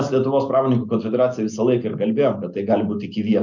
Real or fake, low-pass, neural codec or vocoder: real; 7.2 kHz; none